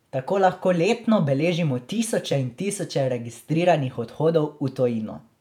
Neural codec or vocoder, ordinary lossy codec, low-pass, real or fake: vocoder, 48 kHz, 128 mel bands, Vocos; none; 19.8 kHz; fake